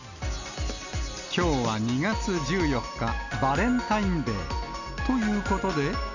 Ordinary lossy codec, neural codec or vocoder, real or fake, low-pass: none; none; real; 7.2 kHz